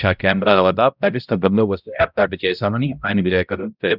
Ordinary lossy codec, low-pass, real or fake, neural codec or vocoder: none; 5.4 kHz; fake; codec, 16 kHz, 0.5 kbps, X-Codec, HuBERT features, trained on balanced general audio